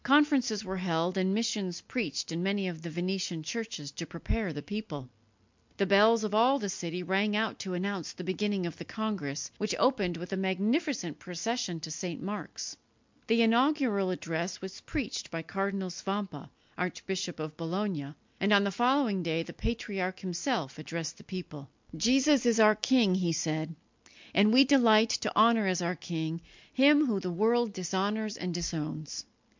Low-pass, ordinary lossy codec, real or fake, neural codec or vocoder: 7.2 kHz; MP3, 64 kbps; real; none